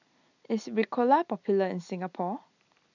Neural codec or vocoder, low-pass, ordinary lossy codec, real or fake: none; 7.2 kHz; none; real